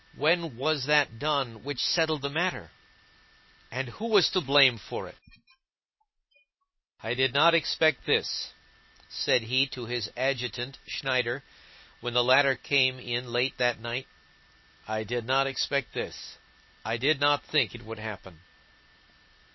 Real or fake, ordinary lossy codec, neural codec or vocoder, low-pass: real; MP3, 24 kbps; none; 7.2 kHz